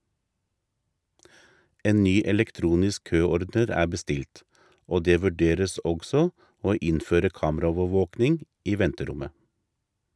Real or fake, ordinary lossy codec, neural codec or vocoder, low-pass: real; none; none; none